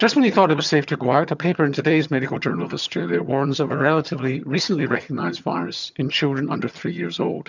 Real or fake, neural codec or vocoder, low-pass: fake; vocoder, 22.05 kHz, 80 mel bands, HiFi-GAN; 7.2 kHz